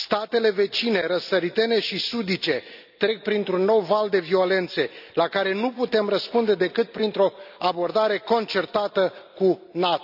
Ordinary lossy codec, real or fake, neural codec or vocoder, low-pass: none; real; none; 5.4 kHz